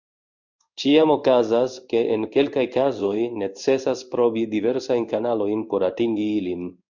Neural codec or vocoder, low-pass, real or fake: codec, 16 kHz in and 24 kHz out, 1 kbps, XY-Tokenizer; 7.2 kHz; fake